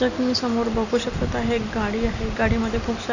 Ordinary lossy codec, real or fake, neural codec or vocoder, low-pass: none; real; none; 7.2 kHz